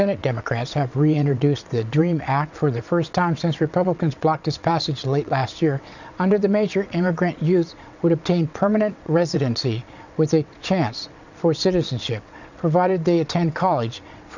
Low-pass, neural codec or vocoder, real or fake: 7.2 kHz; vocoder, 22.05 kHz, 80 mel bands, WaveNeXt; fake